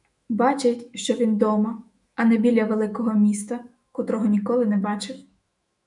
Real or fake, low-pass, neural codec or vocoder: fake; 10.8 kHz; autoencoder, 48 kHz, 128 numbers a frame, DAC-VAE, trained on Japanese speech